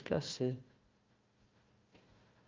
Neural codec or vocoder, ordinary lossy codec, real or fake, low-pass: codec, 16 kHz, 1 kbps, FunCodec, trained on Chinese and English, 50 frames a second; Opus, 32 kbps; fake; 7.2 kHz